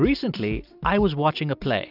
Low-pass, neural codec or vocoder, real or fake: 5.4 kHz; none; real